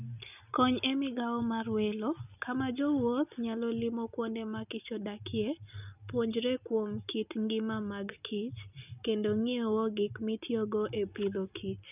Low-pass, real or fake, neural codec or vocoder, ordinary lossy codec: 3.6 kHz; real; none; none